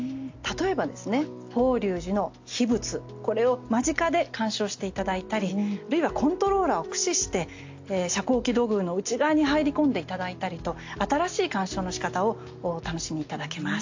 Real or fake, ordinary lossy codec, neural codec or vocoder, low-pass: real; AAC, 48 kbps; none; 7.2 kHz